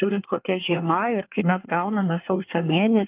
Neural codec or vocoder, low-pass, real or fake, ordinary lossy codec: codec, 24 kHz, 1 kbps, SNAC; 3.6 kHz; fake; Opus, 32 kbps